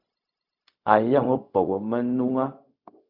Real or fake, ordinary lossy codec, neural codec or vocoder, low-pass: fake; Opus, 64 kbps; codec, 16 kHz, 0.4 kbps, LongCat-Audio-Codec; 5.4 kHz